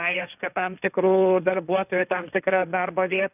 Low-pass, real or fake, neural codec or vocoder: 3.6 kHz; fake; codec, 16 kHz, 1.1 kbps, Voila-Tokenizer